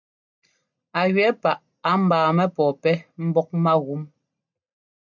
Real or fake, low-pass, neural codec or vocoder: real; 7.2 kHz; none